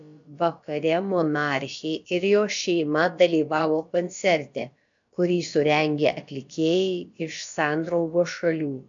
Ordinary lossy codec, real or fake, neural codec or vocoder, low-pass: AAC, 64 kbps; fake; codec, 16 kHz, about 1 kbps, DyCAST, with the encoder's durations; 7.2 kHz